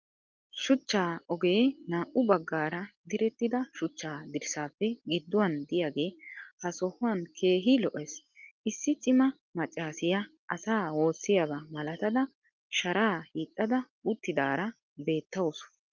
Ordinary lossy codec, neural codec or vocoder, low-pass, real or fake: Opus, 24 kbps; none; 7.2 kHz; real